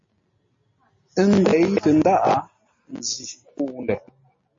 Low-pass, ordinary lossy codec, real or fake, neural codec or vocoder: 7.2 kHz; MP3, 32 kbps; real; none